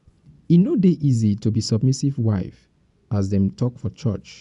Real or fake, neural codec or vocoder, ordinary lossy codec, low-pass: fake; vocoder, 24 kHz, 100 mel bands, Vocos; none; 10.8 kHz